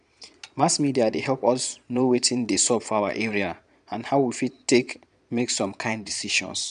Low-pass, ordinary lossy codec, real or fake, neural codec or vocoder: 9.9 kHz; none; fake; vocoder, 22.05 kHz, 80 mel bands, Vocos